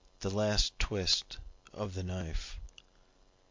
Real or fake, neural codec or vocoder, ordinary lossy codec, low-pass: real; none; MP3, 48 kbps; 7.2 kHz